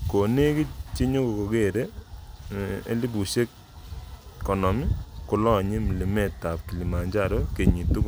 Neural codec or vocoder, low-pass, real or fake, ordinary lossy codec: none; none; real; none